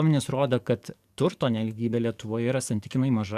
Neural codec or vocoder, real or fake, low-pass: codec, 44.1 kHz, 7.8 kbps, DAC; fake; 14.4 kHz